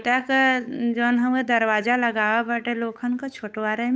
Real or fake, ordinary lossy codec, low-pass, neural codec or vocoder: fake; none; none; codec, 16 kHz, 8 kbps, FunCodec, trained on Chinese and English, 25 frames a second